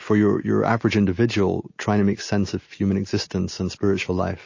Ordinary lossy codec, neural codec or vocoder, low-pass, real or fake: MP3, 32 kbps; none; 7.2 kHz; real